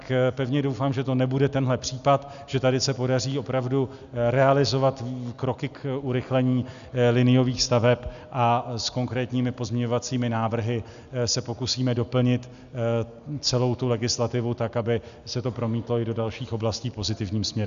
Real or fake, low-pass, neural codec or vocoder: real; 7.2 kHz; none